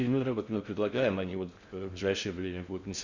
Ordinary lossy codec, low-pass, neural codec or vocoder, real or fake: Opus, 64 kbps; 7.2 kHz; codec, 16 kHz in and 24 kHz out, 0.6 kbps, FocalCodec, streaming, 4096 codes; fake